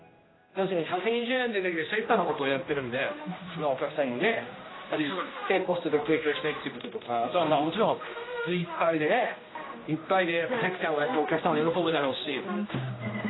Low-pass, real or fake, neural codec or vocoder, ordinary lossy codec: 7.2 kHz; fake; codec, 16 kHz, 1 kbps, X-Codec, HuBERT features, trained on general audio; AAC, 16 kbps